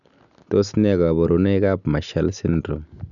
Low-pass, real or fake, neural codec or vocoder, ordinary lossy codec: 7.2 kHz; real; none; none